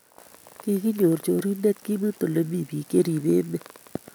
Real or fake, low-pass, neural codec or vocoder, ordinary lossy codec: real; none; none; none